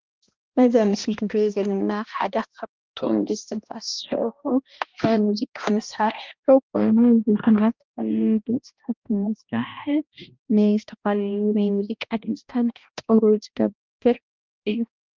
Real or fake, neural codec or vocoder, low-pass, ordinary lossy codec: fake; codec, 16 kHz, 1 kbps, X-Codec, HuBERT features, trained on balanced general audio; 7.2 kHz; Opus, 32 kbps